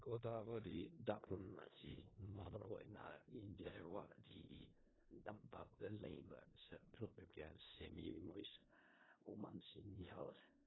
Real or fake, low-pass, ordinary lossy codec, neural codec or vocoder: fake; 7.2 kHz; AAC, 16 kbps; codec, 16 kHz in and 24 kHz out, 0.4 kbps, LongCat-Audio-Codec, four codebook decoder